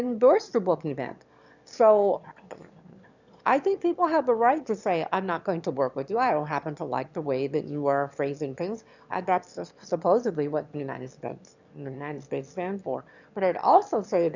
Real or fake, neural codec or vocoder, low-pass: fake; autoencoder, 22.05 kHz, a latent of 192 numbers a frame, VITS, trained on one speaker; 7.2 kHz